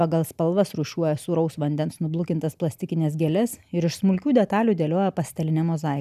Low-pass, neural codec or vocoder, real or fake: 14.4 kHz; none; real